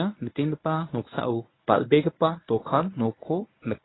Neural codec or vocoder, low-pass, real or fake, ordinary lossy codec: codec, 24 kHz, 0.9 kbps, WavTokenizer, medium speech release version 1; 7.2 kHz; fake; AAC, 16 kbps